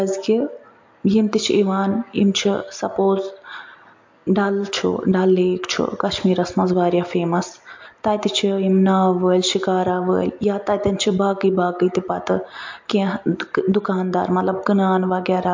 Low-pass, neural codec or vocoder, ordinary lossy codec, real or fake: 7.2 kHz; none; MP3, 48 kbps; real